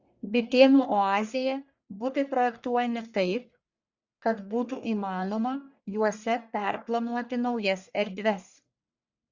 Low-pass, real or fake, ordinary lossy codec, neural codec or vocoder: 7.2 kHz; fake; Opus, 64 kbps; codec, 44.1 kHz, 1.7 kbps, Pupu-Codec